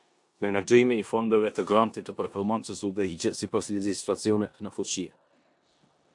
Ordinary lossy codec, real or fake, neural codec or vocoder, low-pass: AAC, 64 kbps; fake; codec, 16 kHz in and 24 kHz out, 0.9 kbps, LongCat-Audio-Codec, four codebook decoder; 10.8 kHz